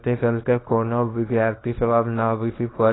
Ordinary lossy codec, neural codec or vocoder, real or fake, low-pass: AAC, 16 kbps; codec, 24 kHz, 0.9 kbps, WavTokenizer, small release; fake; 7.2 kHz